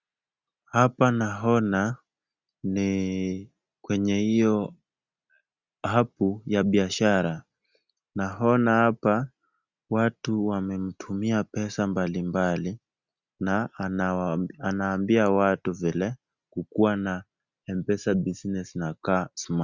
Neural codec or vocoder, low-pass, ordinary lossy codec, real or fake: none; 7.2 kHz; Opus, 64 kbps; real